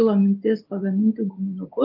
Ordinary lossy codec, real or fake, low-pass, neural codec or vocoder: Opus, 32 kbps; real; 5.4 kHz; none